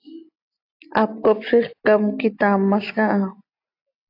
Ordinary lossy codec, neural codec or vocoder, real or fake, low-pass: AAC, 32 kbps; none; real; 5.4 kHz